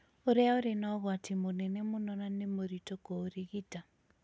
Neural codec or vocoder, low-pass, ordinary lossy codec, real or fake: none; none; none; real